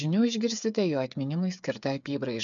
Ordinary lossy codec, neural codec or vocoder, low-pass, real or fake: MP3, 96 kbps; codec, 16 kHz, 8 kbps, FreqCodec, smaller model; 7.2 kHz; fake